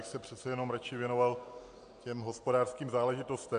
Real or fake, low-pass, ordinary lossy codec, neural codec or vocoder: real; 9.9 kHz; AAC, 64 kbps; none